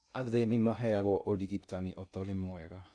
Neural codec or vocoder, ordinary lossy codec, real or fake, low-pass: codec, 16 kHz in and 24 kHz out, 0.6 kbps, FocalCodec, streaming, 2048 codes; none; fake; 9.9 kHz